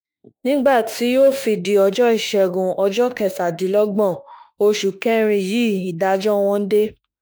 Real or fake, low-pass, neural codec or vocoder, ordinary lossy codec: fake; none; autoencoder, 48 kHz, 32 numbers a frame, DAC-VAE, trained on Japanese speech; none